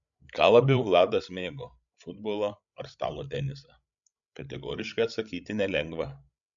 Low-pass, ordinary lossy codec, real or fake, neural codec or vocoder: 7.2 kHz; MP3, 64 kbps; fake; codec, 16 kHz, 16 kbps, FreqCodec, larger model